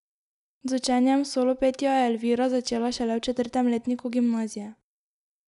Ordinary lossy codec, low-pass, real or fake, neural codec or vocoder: none; 10.8 kHz; real; none